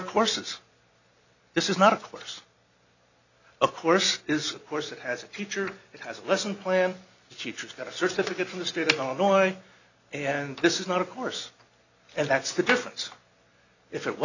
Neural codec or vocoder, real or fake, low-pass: none; real; 7.2 kHz